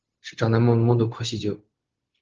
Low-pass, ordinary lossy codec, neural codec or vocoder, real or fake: 7.2 kHz; Opus, 16 kbps; codec, 16 kHz, 0.4 kbps, LongCat-Audio-Codec; fake